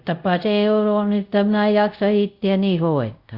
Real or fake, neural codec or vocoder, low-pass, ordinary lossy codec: fake; codec, 24 kHz, 0.5 kbps, DualCodec; 5.4 kHz; Opus, 64 kbps